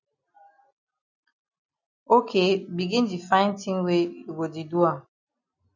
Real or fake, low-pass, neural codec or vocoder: real; 7.2 kHz; none